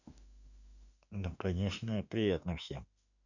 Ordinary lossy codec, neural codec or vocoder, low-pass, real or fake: none; autoencoder, 48 kHz, 32 numbers a frame, DAC-VAE, trained on Japanese speech; 7.2 kHz; fake